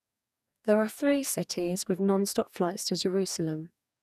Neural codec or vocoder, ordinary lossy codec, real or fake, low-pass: codec, 44.1 kHz, 2.6 kbps, DAC; none; fake; 14.4 kHz